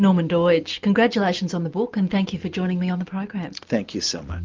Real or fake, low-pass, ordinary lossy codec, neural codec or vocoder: real; 7.2 kHz; Opus, 24 kbps; none